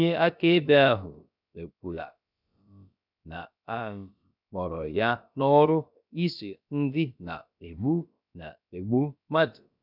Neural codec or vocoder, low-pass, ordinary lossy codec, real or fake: codec, 16 kHz, about 1 kbps, DyCAST, with the encoder's durations; 5.4 kHz; none; fake